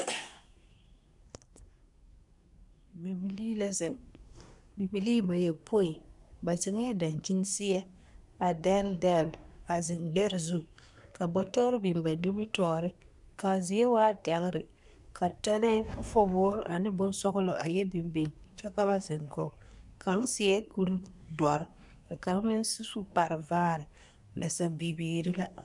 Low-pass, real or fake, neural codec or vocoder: 10.8 kHz; fake; codec, 24 kHz, 1 kbps, SNAC